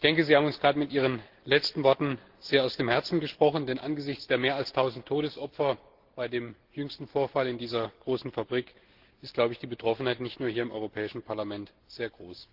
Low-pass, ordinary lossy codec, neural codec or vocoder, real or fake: 5.4 kHz; Opus, 16 kbps; none; real